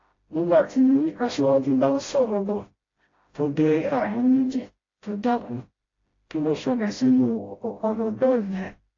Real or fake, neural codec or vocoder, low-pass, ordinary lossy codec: fake; codec, 16 kHz, 0.5 kbps, FreqCodec, smaller model; 7.2 kHz; AAC, 32 kbps